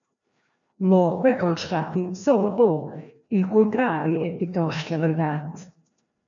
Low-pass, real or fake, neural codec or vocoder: 7.2 kHz; fake; codec, 16 kHz, 1 kbps, FreqCodec, larger model